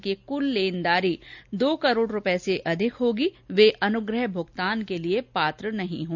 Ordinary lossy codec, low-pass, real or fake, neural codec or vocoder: none; 7.2 kHz; real; none